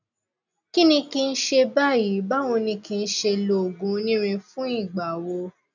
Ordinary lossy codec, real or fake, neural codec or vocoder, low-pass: none; real; none; 7.2 kHz